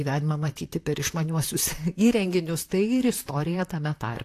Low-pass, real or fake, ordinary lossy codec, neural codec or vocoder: 14.4 kHz; fake; AAC, 64 kbps; vocoder, 44.1 kHz, 128 mel bands, Pupu-Vocoder